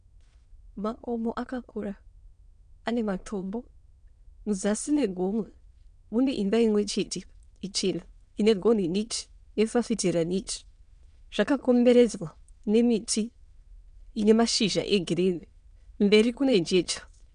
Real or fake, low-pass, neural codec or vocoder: fake; 9.9 kHz; autoencoder, 22.05 kHz, a latent of 192 numbers a frame, VITS, trained on many speakers